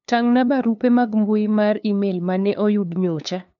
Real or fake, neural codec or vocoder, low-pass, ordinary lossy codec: fake; codec, 16 kHz, 2 kbps, FunCodec, trained on LibriTTS, 25 frames a second; 7.2 kHz; none